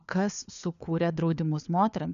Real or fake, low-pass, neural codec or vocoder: fake; 7.2 kHz; codec, 16 kHz, 4 kbps, FunCodec, trained on LibriTTS, 50 frames a second